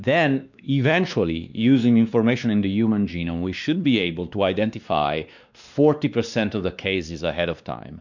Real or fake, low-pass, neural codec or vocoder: fake; 7.2 kHz; codec, 16 kHz, 2 kbps, X-Codec, WavLM features, trained on Multilingual LibriSpeech